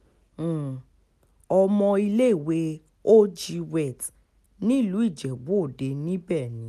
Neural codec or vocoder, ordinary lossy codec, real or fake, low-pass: none; none; real; 14.4 kHz